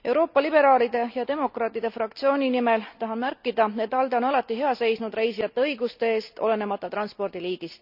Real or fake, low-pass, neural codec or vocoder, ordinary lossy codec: real; 5.4 kHz; none; none